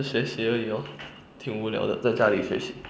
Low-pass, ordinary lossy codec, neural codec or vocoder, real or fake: none; none; none; real